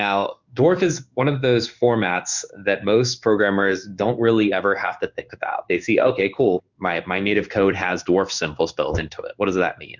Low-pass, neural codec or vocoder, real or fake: 7.2 kHz; codec, 16 kHz in and 24 kHz out, 1 kbps, XY-Tokenizer; fake